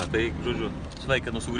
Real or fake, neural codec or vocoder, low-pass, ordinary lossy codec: real; none; 9.9 kHz; MP3, 96 kbps